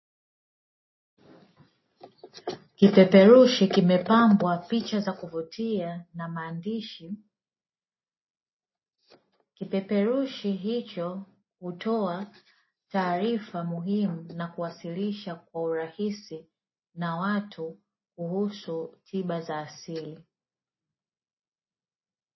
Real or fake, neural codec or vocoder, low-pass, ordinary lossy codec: real; none; 7.2 kHz; MP3, 24 kbps